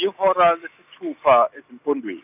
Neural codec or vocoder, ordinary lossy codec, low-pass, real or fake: none; none; 3.6 kHz; real